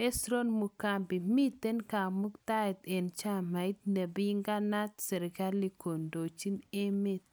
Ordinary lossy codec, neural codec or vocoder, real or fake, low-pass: none; none; real; none